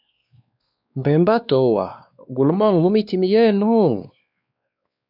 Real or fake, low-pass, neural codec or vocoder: fake; 5.4 kHz; codec, 16 kHz, 2 kbps, X-Codec, WavLM features, trained on Multilingual LibriSpeech